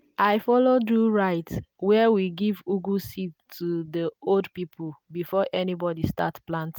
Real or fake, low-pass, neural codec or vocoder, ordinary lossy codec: real; none; none; none